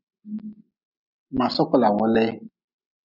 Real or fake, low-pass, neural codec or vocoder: real; 5.4 kHz; none